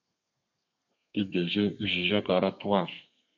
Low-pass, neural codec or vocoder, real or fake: 7.2 kHz; codec, 32 kHz, 1.9 kbps, SNAC; fake